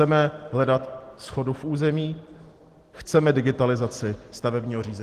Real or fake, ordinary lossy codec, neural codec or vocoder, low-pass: real; Opus, 16 kbps; none; 14.4 kHz